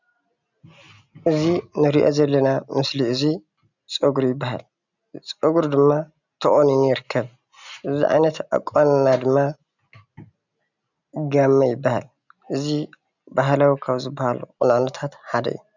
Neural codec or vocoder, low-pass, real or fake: none; 7.2 kHz; real